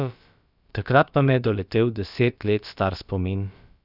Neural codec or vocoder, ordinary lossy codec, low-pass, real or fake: codec, 16 kHz, about 1 kbps, DyCAST, with the encoder's durations; none; 5.4 kHz; fake